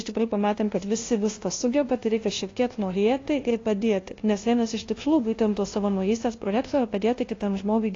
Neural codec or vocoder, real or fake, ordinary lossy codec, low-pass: codec, 16 kHz, 0.5 kbps, FunCodec, trained on LibriTTS, 25 frames a second; fake; AAC, 32 kbps; 7.2 kHz